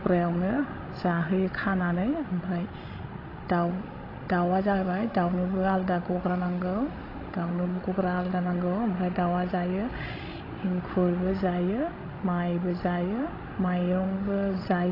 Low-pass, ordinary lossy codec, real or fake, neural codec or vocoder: 5.4 kHz; AAC, 24 kbps; fake; codec, 16 kHz, 16 kbps, FreqCodec, larger model